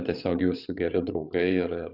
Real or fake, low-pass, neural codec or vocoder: fake; 5.4 kHz; codec, 16 kHz, 16 kbps, FreqCodec, larger model